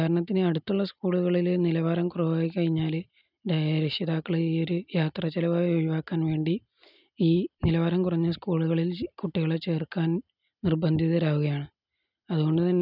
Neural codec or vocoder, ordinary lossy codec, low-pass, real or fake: none; none; 5.4 kHz; real